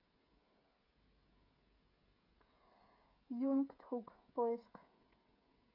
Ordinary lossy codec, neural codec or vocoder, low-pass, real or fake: none; codec, 16 kHz, 16 kbps, FreqCodec, smaller model; 5.4 kHz; fake